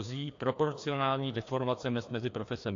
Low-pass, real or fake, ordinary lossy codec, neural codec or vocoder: 7.2 kHz; fake; AAC, 48 kbps; codec, 16 kHz, 2 kbps, FreqCodec, larger model